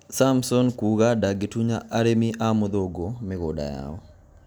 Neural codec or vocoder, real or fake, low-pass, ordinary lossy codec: none; real; none; none